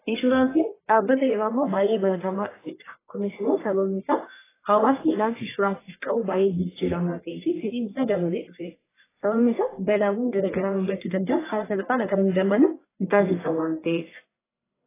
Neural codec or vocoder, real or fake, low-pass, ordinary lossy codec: codec, 44.1 kHz, 1.7 kbps, Pupu-Codec; fake; 3.6 kHz; AAC, 16 kbps